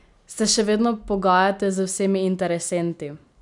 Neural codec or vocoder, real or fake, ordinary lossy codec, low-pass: none; real; none; 10.8 kHz